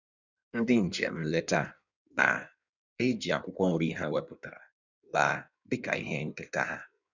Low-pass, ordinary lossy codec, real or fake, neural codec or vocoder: 7.2 kHz; none; fake; codec, 16 kHz in and 24 kHz out, 1.1 kbps, FireRedTTS-2 codec